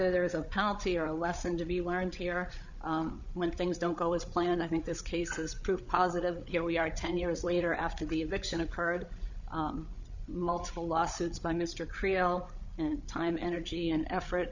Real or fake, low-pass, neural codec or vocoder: fake; 7.2 kHz; codec, 16 kHz, 8 kbps, FreqCodec, larger model